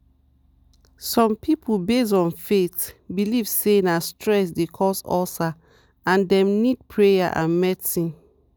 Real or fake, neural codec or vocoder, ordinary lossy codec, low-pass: real; none; none; none